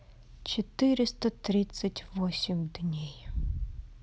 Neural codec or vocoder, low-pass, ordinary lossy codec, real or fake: none; none; none; real